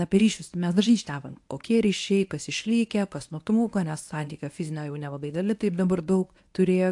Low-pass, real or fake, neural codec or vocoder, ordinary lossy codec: 10.8 kHz; fake; codec, 24 kHz, 0.9 kbps, WavTokenizer, medium speech release version 1; AAC, 64 kbps